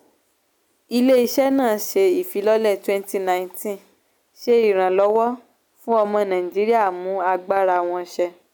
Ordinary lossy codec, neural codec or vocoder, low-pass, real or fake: none; none; none; real